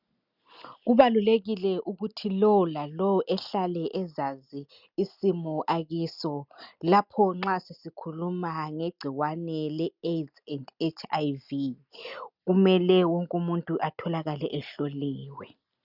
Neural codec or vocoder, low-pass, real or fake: none; 5.4 kHz; real